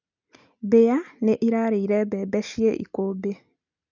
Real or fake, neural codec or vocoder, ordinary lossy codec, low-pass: real; none; none; 7.2 kHz